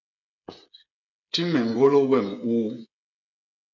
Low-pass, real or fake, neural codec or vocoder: 7.2 kHz; fake; codec, 16 kHz, 8 kbps, FreqCodec, smaller model